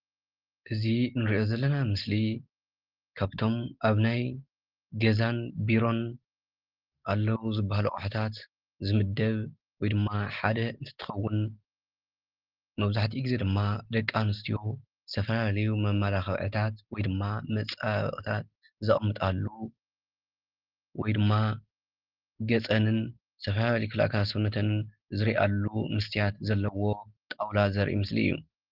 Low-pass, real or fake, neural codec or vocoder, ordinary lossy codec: 5.4 kHz; real; none; Opus, 16 kbps